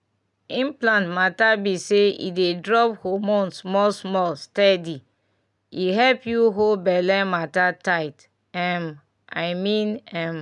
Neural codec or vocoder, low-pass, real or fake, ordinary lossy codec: none; 10.8 kHz; real; none